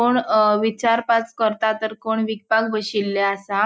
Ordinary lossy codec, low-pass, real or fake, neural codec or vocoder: none; none; real; none